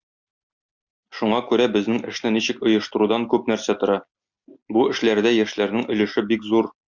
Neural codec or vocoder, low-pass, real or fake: none; 7.2 kHz; real